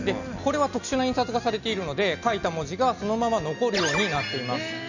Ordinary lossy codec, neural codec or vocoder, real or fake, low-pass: none; none; real; 7.2 kHz